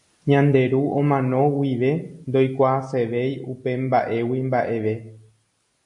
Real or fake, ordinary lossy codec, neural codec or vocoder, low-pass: real; AAC, 64 kbps; none; 10.8 kHz